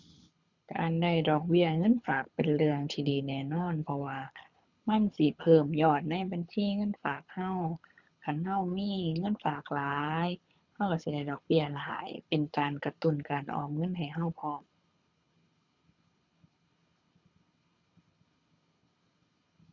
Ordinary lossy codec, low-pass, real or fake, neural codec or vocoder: none; 7.2 kHz; fake; codec, 24 kHz, 6 kbps, HILCodec